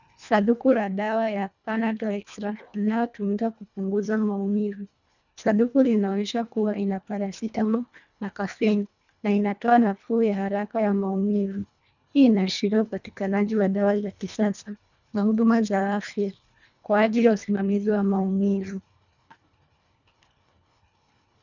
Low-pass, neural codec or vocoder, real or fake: 7.2 kHz; codec, 24 kHz, 1.5 kbps, HILCodec; fake